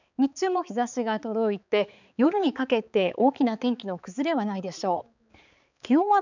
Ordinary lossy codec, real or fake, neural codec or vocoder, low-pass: none; fake; codec, 16 kHz, 4 kbps, X-Codec, HuBERT features, trained on balanced general audio; 7.2 kHz